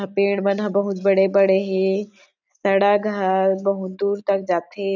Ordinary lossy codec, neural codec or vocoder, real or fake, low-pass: none; none; real; 7.2 kHz